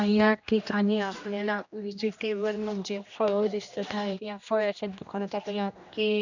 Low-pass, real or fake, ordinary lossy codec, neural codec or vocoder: 7.2 kHz; fake; none; codec, 16 kHz, 1 kbps, X-Codec, HuBERT features, trained on general audio